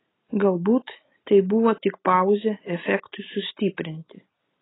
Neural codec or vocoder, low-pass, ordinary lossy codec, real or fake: none; 7.2 kHz; AAC, 16 kbps; real